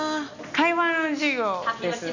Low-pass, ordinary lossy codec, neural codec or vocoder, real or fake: 7.2 kHz; none; none; real